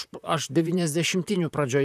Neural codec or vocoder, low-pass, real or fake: vocoder, 44.1 kHz, 128 mel bands, Pupu-Vocoder; 14.4 kHz; fake